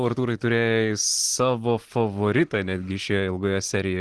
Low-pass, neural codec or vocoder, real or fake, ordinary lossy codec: 10.8 kHz; none; real; Opus, 16 kbps